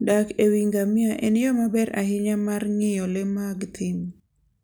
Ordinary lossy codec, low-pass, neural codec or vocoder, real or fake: none; none; none; real